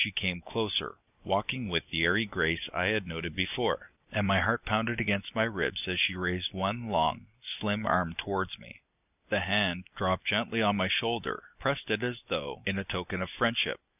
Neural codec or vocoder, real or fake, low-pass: none; real; 3.6 kHz